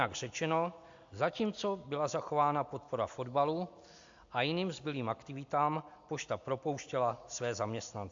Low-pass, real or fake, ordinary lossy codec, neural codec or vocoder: 7.2 kHz; real; AAC, 64 kbps; none